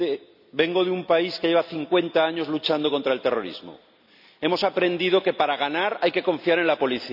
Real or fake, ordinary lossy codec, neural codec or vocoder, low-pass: real; none; none; 5.4 kHz